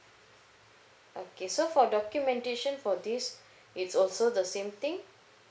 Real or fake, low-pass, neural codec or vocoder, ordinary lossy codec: real; none; none; none